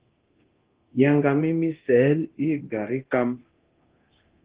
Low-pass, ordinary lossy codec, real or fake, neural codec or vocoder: 3.6 kHz; Opus, 16 kbps; fake; codec, 24 kHz, 0.9 kbps, DualCodec